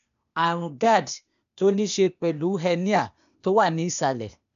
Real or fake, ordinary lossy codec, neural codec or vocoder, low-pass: fake; none; codec, 16 kHz, 0.8 kbps, ZipCodec; 7.2 kHz